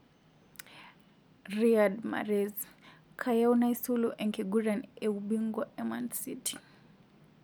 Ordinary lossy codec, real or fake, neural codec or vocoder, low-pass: none; real; none; none